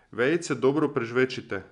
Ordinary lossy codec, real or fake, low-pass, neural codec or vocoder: none; real; 10.8 kHz; none